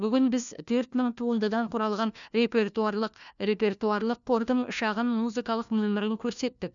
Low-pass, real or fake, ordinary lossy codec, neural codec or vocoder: 7.2 kHz; fake; none; codec, 16 kHz, 1 kbps, FunCodec, trained on LibriTTS, 50 frames a second